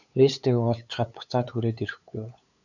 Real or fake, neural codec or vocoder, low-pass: fake; codec, 16 kHz, 8 kbps, FunCodec, trained on LibriTTS, 25 frames a second; 7.2 kHz